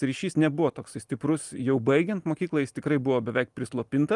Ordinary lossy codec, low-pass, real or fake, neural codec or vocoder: Opus, 32 kbps; 10.8 kHz; real; none